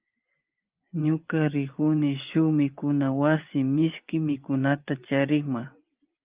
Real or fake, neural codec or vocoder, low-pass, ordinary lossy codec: fake; vocoder, 24 kHz, 100 mel bands, Vocos; 3.6 kHz; Opus, 32 kbps